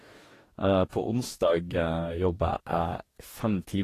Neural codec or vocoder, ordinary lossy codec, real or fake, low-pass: codec, 44.1 kHz, 2.6 kbps, DAC; AAC, 48 kbps; fake; 14.4 kHz